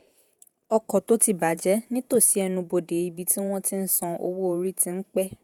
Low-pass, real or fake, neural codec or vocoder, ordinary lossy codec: 19.8 kHz; fake; vocoder, 48 kHz, 128 mel bands, Vocos; none